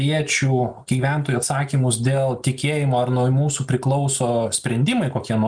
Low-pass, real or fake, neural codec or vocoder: 9.9 kHz; real; none